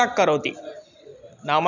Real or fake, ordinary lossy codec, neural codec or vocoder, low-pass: real; none; none; 7.2 kHz